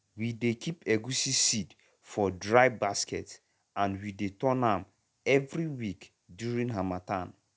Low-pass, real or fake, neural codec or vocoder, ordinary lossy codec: none; real; none; none